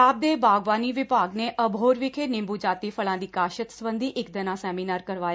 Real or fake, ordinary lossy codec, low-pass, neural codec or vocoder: real; none; none; none